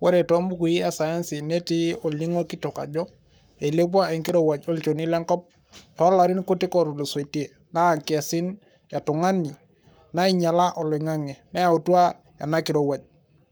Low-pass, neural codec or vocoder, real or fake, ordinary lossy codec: none; codec, 44.1 kHz, 7.8 kbps, Pupu-Codec; fake; none